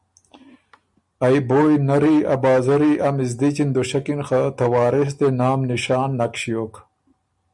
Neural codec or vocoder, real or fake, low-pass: none; real; 10.8 kHz